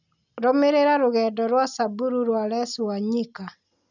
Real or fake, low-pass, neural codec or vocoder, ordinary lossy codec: real; 7.2 kHz; none; none